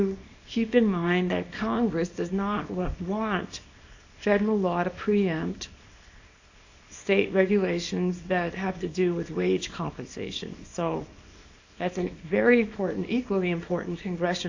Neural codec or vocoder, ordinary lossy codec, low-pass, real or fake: codec, 24 kHz, 0.9 kbps, WavTokenizer, small release; AAC, 48 kbps; 7.2 kHz; fake